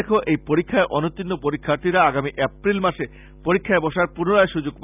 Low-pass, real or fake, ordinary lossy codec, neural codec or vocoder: 3.6 kHz; real; none; none